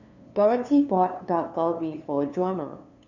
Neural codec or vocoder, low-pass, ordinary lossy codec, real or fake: codec, 16 kHz, 2 kbps, FunCodec, trained on LibriTTS, 25 frames a second; 7.2 kHz; none; fake